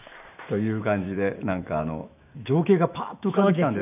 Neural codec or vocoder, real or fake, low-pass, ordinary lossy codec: autoencoder, 48 kHz, 128 numbers a frame, DAC-VAE, trained on Japanese speech; fake; 3.6 kHz; none